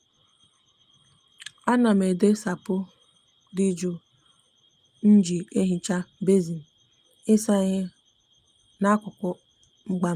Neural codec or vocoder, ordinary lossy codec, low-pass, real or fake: none; Opus, 32 kbps; 14.4 kHz; real